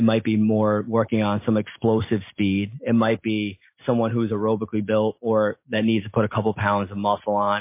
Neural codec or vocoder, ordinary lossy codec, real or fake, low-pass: none; MP3, 24 kbps; real; 3.6 kHz